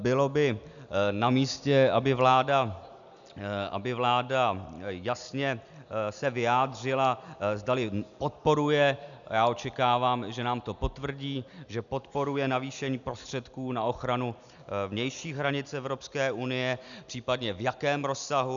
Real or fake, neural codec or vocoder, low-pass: real; none; 7.2 kHz